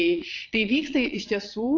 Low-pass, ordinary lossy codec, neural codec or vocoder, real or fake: 7.2 kHz; AAC, 48 kbps; vocoder, 22.05 kHz, 80 mel bands, Vocos; fake